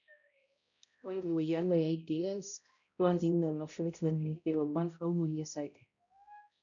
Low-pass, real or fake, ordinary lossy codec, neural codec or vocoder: 7.2 kHz; fake; none; codec, 16 kHz, 0.5 kbps, X-Codec, HuBERT features, trained on balanced general audio